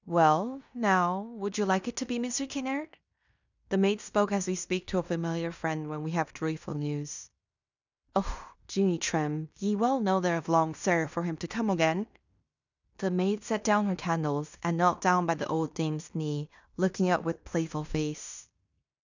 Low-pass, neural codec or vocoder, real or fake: 7.2 kHz; codec, 16 kHz in and 24 kHz out, 0.9 kbps, LongCat-Audio-Codec, fine tuned four codebook decoder; fake